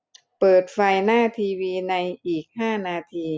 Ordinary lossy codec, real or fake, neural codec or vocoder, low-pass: none; real; none; none